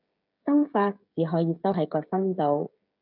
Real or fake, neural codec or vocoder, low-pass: fake; codec, 16 kHz, 16 kbps, FreqCodec, smaller model; 5.4 kHz